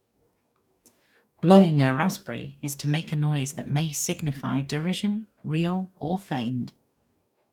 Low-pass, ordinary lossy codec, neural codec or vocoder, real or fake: 19.8 kHz; none; codec, 44.1 kHz, 2.6 kbps, DAC; fake